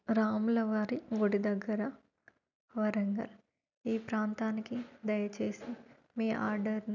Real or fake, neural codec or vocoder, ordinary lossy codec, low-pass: real; none; none; 7.2 kHz